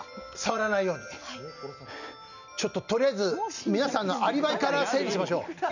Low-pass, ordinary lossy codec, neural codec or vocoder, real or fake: 7.2 kHz; none; none; real